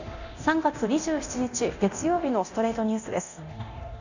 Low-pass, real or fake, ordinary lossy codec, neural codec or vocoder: 7.2 kHz; fake; none; codec, 24 kHz, 0.9 kbps, DualCodec